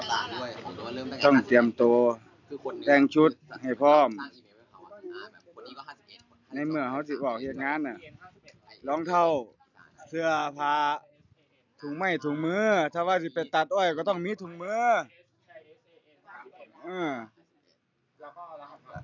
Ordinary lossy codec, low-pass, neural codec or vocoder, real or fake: none; 7.2 kHz; none; real